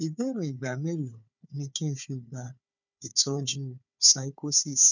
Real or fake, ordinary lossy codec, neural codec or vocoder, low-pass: fake; none; codec, 16 kHz, 4 kbps, FunCodec, trained on Chinese and English, 50 frames a second; 7.2 kHz